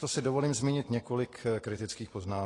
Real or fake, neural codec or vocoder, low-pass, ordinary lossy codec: real; none; 10.8 kHz; AAC, 32 kbps